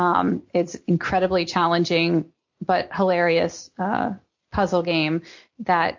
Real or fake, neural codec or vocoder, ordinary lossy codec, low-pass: real; none; MP3, 48 kbps; 7.2 kHz